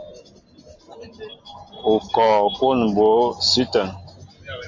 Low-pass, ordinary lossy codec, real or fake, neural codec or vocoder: 7.2 kHz; MP3, 48 kbps; real; none